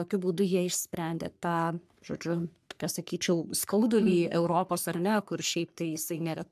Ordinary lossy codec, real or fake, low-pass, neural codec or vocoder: AAC, 96 kbps; fake; 14.4 kHz; codec, 44.1 kHz, 3.4 kbps, Pupu-Codec